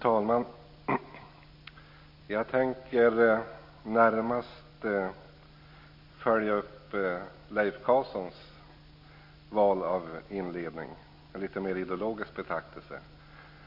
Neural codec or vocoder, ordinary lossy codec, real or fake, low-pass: none; MP3, 32 kbps; real; 5.4 kHz